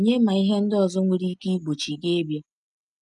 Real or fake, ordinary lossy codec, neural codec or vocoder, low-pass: real; none; none; none